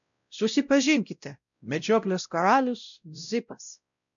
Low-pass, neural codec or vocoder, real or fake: 7.2 kHz; codec, 16 kHz, 0.5 kbps, X-Codec, WavLM features, trained on Multilingual LibriSpeech; fake